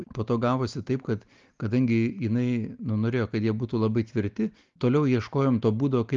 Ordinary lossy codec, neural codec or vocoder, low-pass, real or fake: Opus, 32 kbps; none; 7.2 kHz; real